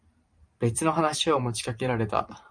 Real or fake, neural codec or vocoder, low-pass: fake; vocoder, 44.1 kHz, 128 mel bands every 512 samples, BigVGAN v2; 9.9 kHz